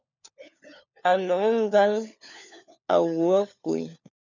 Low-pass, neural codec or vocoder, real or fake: 7.2 kHz; codec, 16 kHz, 4 kbps, FunCodec, trained on LibriTTS, 50 frames a second; fake